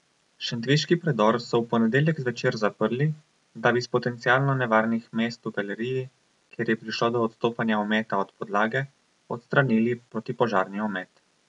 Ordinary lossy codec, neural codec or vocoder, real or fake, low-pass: none; none; real; 10.8 kHz